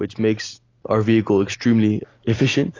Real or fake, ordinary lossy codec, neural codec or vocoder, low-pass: real; AAC, 32 kbps; none; 7.2 kHz